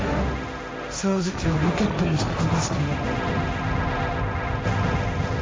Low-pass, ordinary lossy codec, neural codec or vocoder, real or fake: none; none; codec, 16 kHz, 1.1 kbps, Voila-Tokenizer; fake